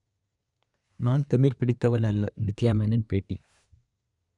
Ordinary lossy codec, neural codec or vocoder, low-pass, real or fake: none; codec, 24 kHz, 1 kbps, SNAC; 10.8 kHz; fake